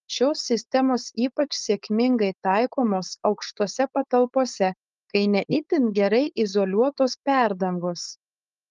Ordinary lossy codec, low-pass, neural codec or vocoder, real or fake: Opus, 32 kbps; 7.2 kHz; codec, 16 kHz, 4.8 kbps, FACodec; fake